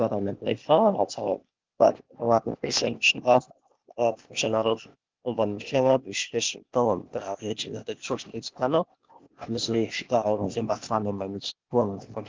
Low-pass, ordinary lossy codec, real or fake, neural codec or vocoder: 7.2 kHz; Opus, 32 kbps; fake; codec, 16 kHz, 0.8 kbps, ZipCodec